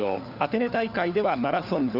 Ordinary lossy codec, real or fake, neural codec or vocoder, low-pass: none; fake; codec, 16 kHz, 4 kbps, FunCodec, trained on LibriTTS, 50 frames a second; 5.4 kHz